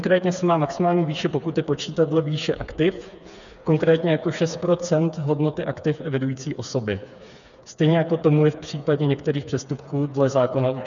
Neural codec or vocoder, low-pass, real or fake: codec, 16 kHz, 4 kbps, FreqCodec, smaller model; 7.2 kHz; fake